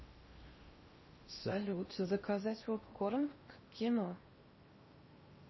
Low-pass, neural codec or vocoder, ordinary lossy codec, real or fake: 7.2 kHz; codec, 16 kHz in and 24 kHz out, 0.6 kbps, FocalCodec, streaming, 4096 codes; MP3, 24 kbps; fake